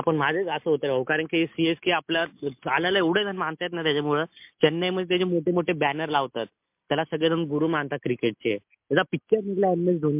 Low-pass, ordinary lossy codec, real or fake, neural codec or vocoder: 3.6 kHz; MP3, 32 kbps; real; none